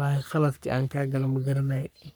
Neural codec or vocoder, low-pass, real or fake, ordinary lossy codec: codec, 44.1 kHz, 3.4 kbps, Pupu-Codec; none; fake; none